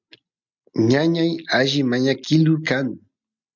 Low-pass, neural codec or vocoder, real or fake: 7.2 kHz; none; real